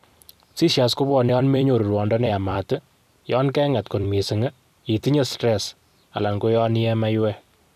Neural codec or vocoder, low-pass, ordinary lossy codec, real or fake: vocoder, 44.1 kHz, 128 mel bands every 256 samples, BigVGAN v2; 14.4 kHz; none; fake